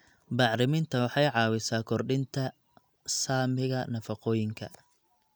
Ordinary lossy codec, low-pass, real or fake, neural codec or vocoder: none; none; real; none